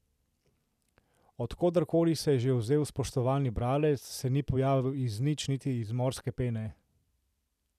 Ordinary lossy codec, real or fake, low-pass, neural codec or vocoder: none; real; 14.4 kHz; none